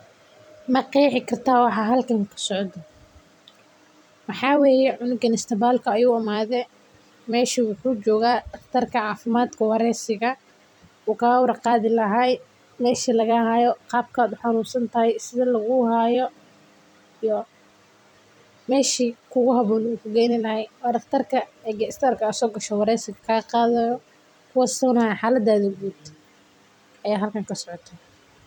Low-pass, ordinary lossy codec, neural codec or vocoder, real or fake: 19.8 kHz; none; vocoder, 44.1 kHz, 128 mel bands every 256 samples, BigVGAN v2; fake